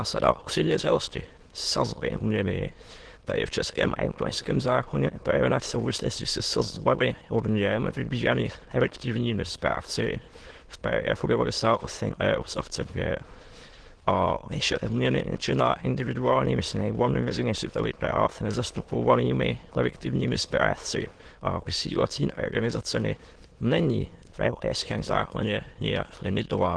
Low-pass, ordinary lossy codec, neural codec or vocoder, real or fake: 9.9 kHz; Opus, 16 kbps; autoencoder, 22.05 kHz, a latent of 192 numbers a frame, VITS, trained on many speakers; fake